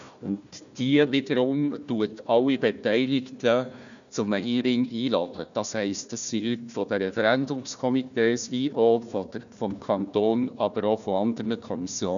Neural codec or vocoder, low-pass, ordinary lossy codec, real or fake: codec, 16 kHz, 1 kbps, FunCodec, trained on Chinese and English, 50 frames a second; 7.2 kHz; none; fake